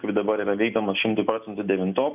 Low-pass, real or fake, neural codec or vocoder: 3.6 kHz; real; none